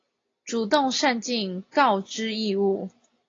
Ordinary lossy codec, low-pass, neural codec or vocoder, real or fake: AAC, 32 kbps; 7.2 kHz; none; real